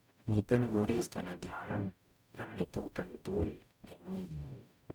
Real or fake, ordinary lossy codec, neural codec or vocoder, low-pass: fake; none; codec, 44.1 kHz, 0.9 kbps, DAC; 19.8 kHz